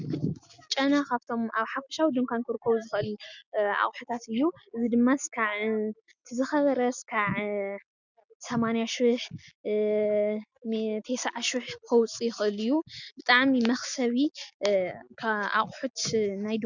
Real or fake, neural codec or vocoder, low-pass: real; none; 7.2 kHz